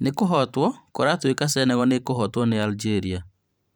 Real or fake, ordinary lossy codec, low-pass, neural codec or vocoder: fake; none; none; vocoder, 44.1 kHz, 128 mel bands every 512 samples, BigVGAN v2